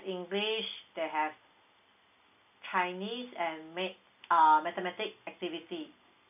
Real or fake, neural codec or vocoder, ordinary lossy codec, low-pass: real; none; none; 3.6 kHz